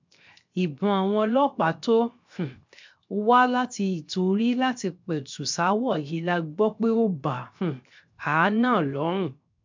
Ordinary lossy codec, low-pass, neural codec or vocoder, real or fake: MP3, 64 kbps; 7.2 kHz; codec, 16 kHz, 0.7 kbps, FocalCodec; fake